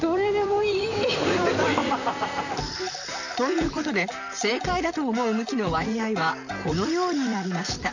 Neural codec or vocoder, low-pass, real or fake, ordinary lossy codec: vocoder, 44.1 kHz, 128 mel bands, Pupu-Vocoder; 7.2 kHz; fake; none